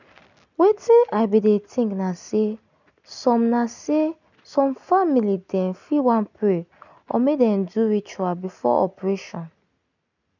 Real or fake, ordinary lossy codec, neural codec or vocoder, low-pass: real; none; none; 7.2 kHz